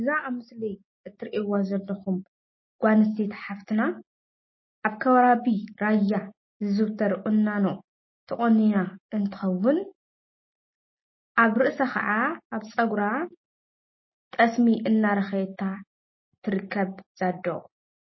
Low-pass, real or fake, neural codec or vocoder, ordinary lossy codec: 7.2 kHz; real; none; MP3, 24 kbps